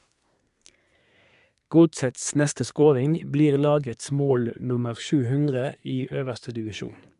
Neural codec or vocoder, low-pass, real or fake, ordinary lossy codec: codec, 24 kHz, 1 kbps, SNAC; 10.8 kHz; fake; none